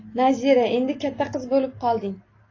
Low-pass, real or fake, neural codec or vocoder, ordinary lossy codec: 7.2 kHz; real; none; AAC, 32 kbps